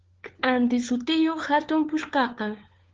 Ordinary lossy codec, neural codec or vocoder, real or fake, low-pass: Opus, 16 kbps; codec, 16 kHz, 4 kbps, FunCodec, trained on LibriTTS, 50 frames a second; fake; 7.2 kHz